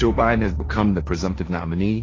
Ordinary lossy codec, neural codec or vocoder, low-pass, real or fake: AAC, 32 kbps; codec, 16 kHz, 1.1 kbps, Voila-Tokenizer; 7.2 kHz; fake